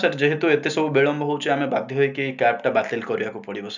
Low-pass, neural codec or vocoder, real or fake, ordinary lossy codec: 7.2 kHz; none; real; none